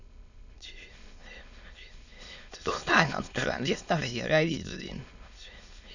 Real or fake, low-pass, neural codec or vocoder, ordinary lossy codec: fake; 7.2 kHz; autoencoder, 22.05 kHz, a latent of 192 numbers a frame, VITS, trained on many speakers; none